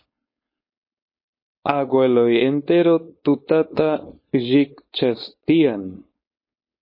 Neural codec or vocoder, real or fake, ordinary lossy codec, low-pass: codec, 16 kHz, 4.8 kbps, FACodec; fake; MP3, 24 kbps; 5.4 kHz